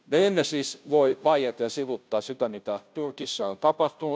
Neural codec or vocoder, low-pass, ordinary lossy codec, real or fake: codec, 16 kHz, 0.5 kbps, FunCodec, trained on Chinese and English, 25 frames a second; none; none; fake